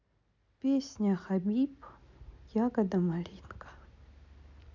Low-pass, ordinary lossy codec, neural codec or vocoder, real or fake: 7.2 kHz; none; none; real